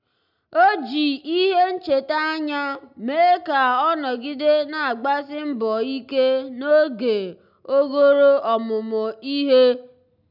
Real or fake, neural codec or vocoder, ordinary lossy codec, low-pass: real; none; none; 5.4 kHz